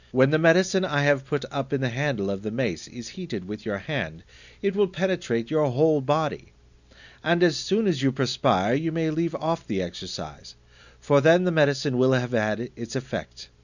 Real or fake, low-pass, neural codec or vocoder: real; 7.2 kHz; none